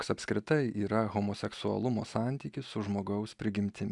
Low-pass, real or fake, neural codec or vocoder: 10.8 kHz; real; none